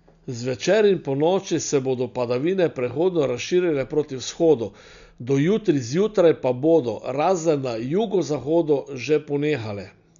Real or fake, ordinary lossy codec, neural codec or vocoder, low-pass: real; none; none; 7.2 kHz